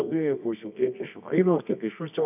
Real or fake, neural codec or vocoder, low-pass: fake; codec, 24 kHz, 0.9 kbps, WavTokenizer, medium music audio release; 3.6 kHz